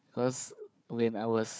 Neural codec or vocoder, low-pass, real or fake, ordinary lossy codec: codec, 16 kHz, 4 kbps, FunCodec, trained on Chinese and English, 50 frames a second; none; fake; none